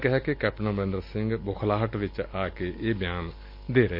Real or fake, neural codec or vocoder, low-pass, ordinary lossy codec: real; none; 5.4 kHz; MP3, 32 kbps